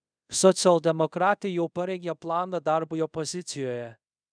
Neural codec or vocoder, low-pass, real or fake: codec, 24 kHz, 0.5 kbps, DualCodec; 9.9 kHz; fake